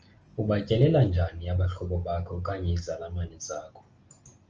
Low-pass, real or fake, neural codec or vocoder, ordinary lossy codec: 7.2 kHz; real; none; Opus, 24 kbps